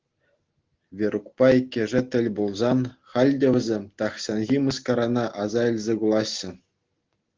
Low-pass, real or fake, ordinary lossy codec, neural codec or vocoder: 7.2 kHz; real; Opus, 16 kbps; none